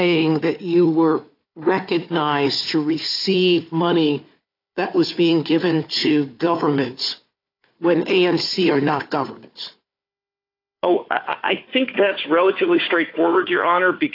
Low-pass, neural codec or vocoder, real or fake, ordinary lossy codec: 5.4 kHz; codec, 16 kHz, 4 kbps, FunCodec, trained on Chinese and English, 50 frames a second; fake; AAC, 32 kbps